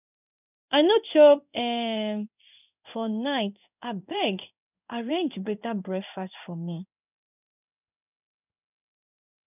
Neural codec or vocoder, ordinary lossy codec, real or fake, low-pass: codec, 16 kHz in and 24 kHz out, 1 kbps, XY-Tokenizer; none; fake; 3.6 kHz